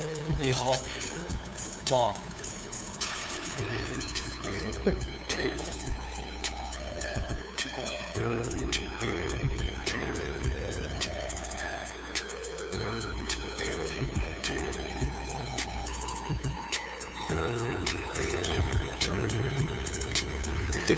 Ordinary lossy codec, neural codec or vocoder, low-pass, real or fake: none; codec, 16 kHz, 2 kbps, FunCodec, trained on LibriTTS, 25 frames a second; none; fake